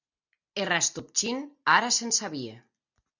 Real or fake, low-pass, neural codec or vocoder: real; 7.2 kHz; none